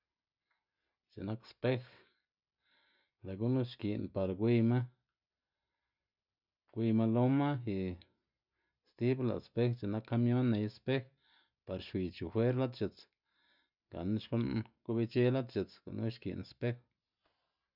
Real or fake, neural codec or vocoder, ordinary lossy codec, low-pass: real; none; none; 5.4 kHz